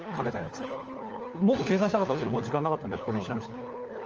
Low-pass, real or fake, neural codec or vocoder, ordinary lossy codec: 7.2 kHz; fake; codec, 16 kHz, 4 kbps, FunCodec, trained on LibriTTS, 50 frames a second; Opus, 24 kbps